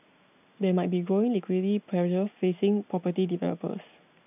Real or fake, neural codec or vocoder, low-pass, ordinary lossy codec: real; none; 3.6 kHz; none